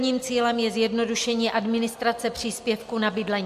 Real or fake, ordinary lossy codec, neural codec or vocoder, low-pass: real; MP3, 64 kbps; none; 14.4 kHz